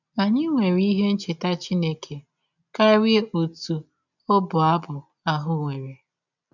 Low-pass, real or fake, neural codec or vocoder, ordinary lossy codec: 7.2 kHz; real; none; none